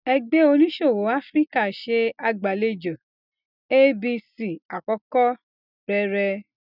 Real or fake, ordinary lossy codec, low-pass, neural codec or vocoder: real; none; 5.4 kHz; none